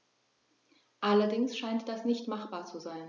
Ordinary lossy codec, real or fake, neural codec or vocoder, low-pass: none; real; none; 7.2 kHz